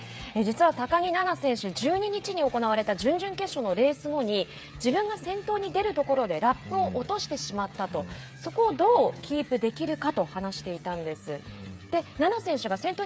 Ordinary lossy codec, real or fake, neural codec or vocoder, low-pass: none; fake; codec, 16 kHz, 8 kbps, FreqCodec, smaller model; none